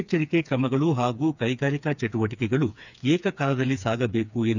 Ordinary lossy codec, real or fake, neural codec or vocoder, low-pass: none; fake; codec, 16 kHz, 4 kbps, FreqCodec, smaller model; 7.2 kHz